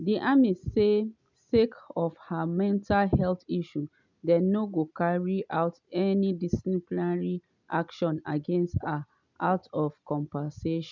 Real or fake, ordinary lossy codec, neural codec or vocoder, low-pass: real; none; none; 7.2 kHz